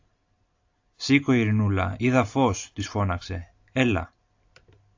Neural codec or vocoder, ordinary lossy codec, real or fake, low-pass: none; AAC, 48 kbps; real; 7.2 kHz